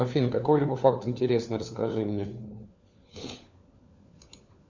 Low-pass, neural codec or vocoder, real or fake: 7.2 kHz; codec, 16 kHz, 4 kbps, FunCodec, trained on LibriTTS, 50 frames a second; fake